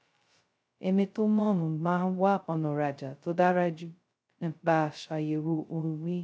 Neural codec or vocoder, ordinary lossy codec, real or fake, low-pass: codec, 16 kHz, 0.2 kbps, FocalCodec; none; fake; none